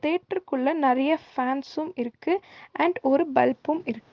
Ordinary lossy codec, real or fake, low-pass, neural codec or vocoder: Opus, 32 kbps; real; 7.2 kHz; none